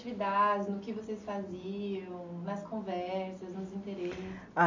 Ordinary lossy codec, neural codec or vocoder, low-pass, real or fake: MP3, 64 kbps; none; 7.2 kHz; real